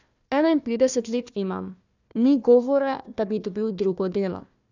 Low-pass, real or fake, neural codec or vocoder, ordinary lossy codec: 7.2 kHz; fake; codec, 16 kHz, 1 kbps, FunCodec, trained on Chinese and English, 50 frames a second; none